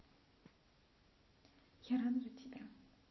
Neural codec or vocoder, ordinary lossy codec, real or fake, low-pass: none; MP3, 24 kbps; real; 7.2 kHz